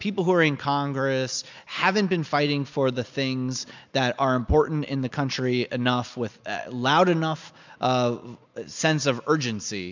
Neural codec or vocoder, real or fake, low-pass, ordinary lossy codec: none; real; 7.2 kHz; MP3, 64 kbps